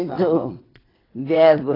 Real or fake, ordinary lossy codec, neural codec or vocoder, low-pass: fake; AAC, 24 kbps; vocoder, 22.05 kHz, 80 mel bands, WaveNeXt; 5.4 kHz